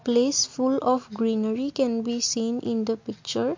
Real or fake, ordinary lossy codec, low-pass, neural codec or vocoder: real; MP3, 48 kbps; 7.2 kHz; none